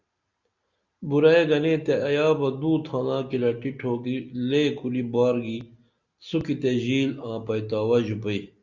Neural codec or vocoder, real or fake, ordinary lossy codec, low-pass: none; real; Opus, 64 kbps; 7.2 kHz